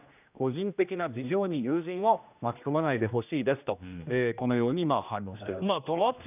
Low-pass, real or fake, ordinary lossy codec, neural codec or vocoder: 3.6 kHz; fake; none; codec, 16 kHz, 1 kbps, X-Codec, HuBERT features, trained on general audio